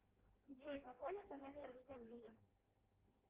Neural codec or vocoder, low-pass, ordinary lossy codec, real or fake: codec, 16 kHz in and 24 kHz out, 0.6 kbps, FireRedTTS-2 codec; 3.6 kHz; Opus, 16 kbps; fake